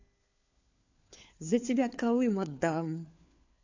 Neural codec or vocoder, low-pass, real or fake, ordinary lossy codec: codec, 16 kHz, 4 kbps, FunCodec, trained on LibriTTS, 50 frames a second; 7.2 kHz; fake; AAC, 48 kbps